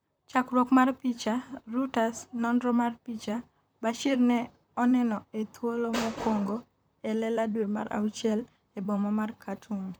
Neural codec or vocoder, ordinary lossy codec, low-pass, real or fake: vocoder, 44.1 kHz, 128 mel bands, Pupu-Vocoder; none; none; fake